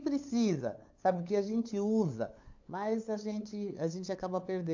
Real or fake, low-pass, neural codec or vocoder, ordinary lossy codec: fake; 7.2 kHz; codec, 16 kHz, 8 kbps, FunCodec, trained on LibriTTS, 25 frames a second; AAC, 48 kbps